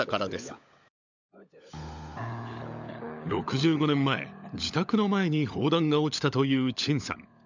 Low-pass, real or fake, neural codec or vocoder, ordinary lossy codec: 7.2 kHz; fake; codec, 16 kHz, 16 kbps, FunCodec, trained on LibriTTS, 50 frames a second; none